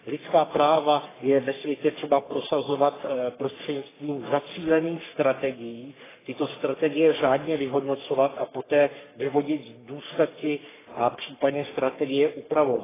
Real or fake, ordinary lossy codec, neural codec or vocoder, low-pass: fake; AAC, 16 kbps; codec, 44.1 kHz, 3.4 kbps, Pupu-Codec; 3.6 kHz